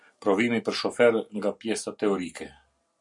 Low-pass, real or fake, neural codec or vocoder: 10.8 kHz; real; none